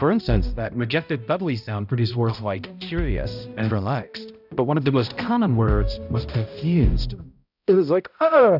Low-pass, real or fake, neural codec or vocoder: 5.4 kHz; fake; codec, 16 kHz, 0.5 kbps, X-Codec, HuBERT features, trained on balanced general audio